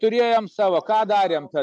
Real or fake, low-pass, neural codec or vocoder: real; 9.9 kHz; none